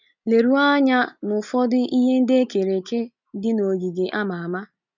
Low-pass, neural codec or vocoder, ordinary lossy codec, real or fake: 7.2 kHz; none; none; real